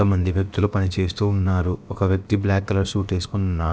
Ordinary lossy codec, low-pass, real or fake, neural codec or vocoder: none; none; fake; codec, 16 kHz, about 1 kbps, DyCAST, with the encoder's durations